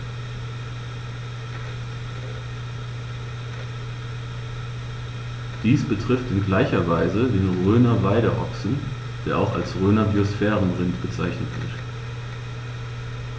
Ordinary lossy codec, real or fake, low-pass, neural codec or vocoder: none; real; none; none